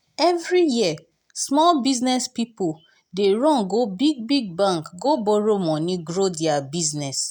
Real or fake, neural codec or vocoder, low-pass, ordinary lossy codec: real; none; 19.8 kHz; none